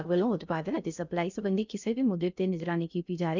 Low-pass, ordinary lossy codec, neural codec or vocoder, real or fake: 7.2 kHz; none; codec, 16 kHz in and 24 kHz out, 0.6 kbps, FocalCodec, streaming, 2048 codes; fake